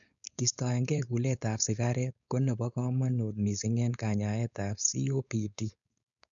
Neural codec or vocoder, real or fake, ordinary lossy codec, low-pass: codec, 16 kHz, 4.8 kbps, FACodec; fake; none; 7.2 kHz